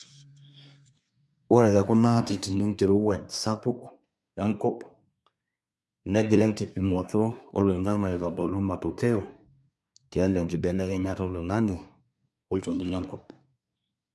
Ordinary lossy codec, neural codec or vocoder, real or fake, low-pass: none; codec, 24 kHz, 1 kbps, SNAC; fake; none